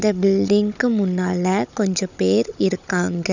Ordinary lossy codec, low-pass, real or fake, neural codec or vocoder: none; 7.2 kHz; fake; codec, 16 kHz, 16 kbps, FunCodec, trained on Chinese and English, 50 frames a second